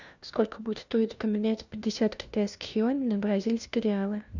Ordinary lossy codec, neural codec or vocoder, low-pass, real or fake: Opus, 64 kbps; codec, 16 kHz, 1 kbps, FunCodec, trained on LibriTTS, 50 frames a second; 7.2 kHz; fake